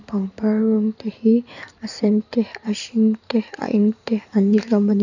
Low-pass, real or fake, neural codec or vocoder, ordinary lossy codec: 7.2 kHz; fake; codec, 16 kHz in and 24 kHz out, 2.2 kbps, FireRedTTS-2 codec; none